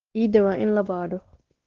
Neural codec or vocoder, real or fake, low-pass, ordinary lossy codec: codec, 16 kHz, 2 kbps, X-Codec, WavLM features, trained on Multilingual LibriSpeech; fake; 7.2 kHz; Opus, 16 kbps